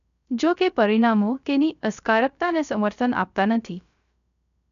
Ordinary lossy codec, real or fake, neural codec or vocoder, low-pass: none; fake; codec, 16 kHz, 0.3 kbps, FocalCodec; 7.2 kHz